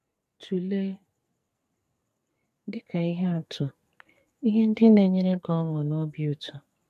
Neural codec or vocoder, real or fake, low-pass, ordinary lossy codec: codec, 44.1 kHz, 2.6 kbps, SNAC; fake; 14.4 kHz; MP3, 64 kbps